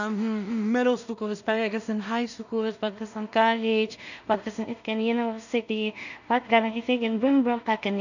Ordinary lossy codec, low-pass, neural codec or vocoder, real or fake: none; 7.2 kHz; codec, 16 kHz in and 24 kHz out, 0.4 kbps, LongCat-Audio-Codec, two codebook decoder; fake